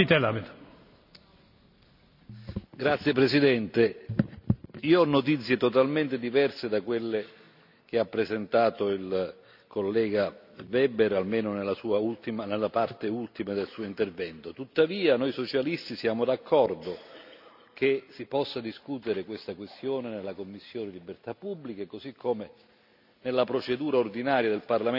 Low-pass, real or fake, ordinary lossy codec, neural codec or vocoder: 5.4 kHz; real; none; none